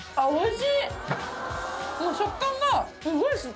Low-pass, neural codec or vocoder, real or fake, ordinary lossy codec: none; none; real; none